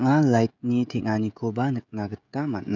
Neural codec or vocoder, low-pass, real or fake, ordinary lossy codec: codec, 16 kHz, 16 kbps, FreqCodec, smaller model; 7.2 kHz; fake; none